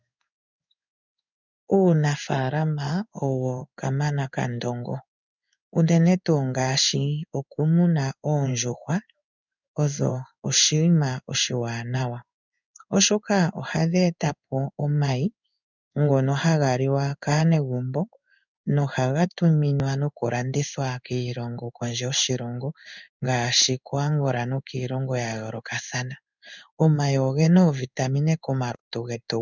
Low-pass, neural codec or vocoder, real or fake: 7.2 kHz; codec, 16 kHz in and 24 kHz out, 1 kbps, XY-Tokenizer; fake